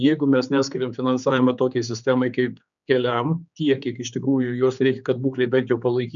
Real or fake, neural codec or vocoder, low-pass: fake; codec, 16 kHz, 4 kbps, X-Codec, HuBERT features, trained on general audio; 7.2 kHz